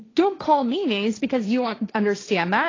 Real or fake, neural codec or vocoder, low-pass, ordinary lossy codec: fake; codec, 16 kHz, 1.1 kbps, Voila-Tokenizer; 7.2 kHz; AAC, 32 kbps